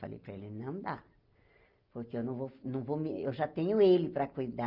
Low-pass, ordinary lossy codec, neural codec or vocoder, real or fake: 5.4 kHz; Opus, 24 kbps; none; real